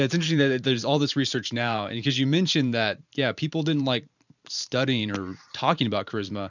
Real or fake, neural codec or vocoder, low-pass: fake; vocoder, 44.1 kHz, 128 mel bands every 512 samples, BigVGAN v2; 7.2 kHz